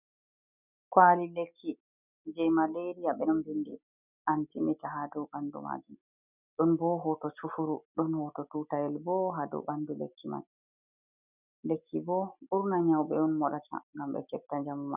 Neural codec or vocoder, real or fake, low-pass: none; real; 3.6 kHz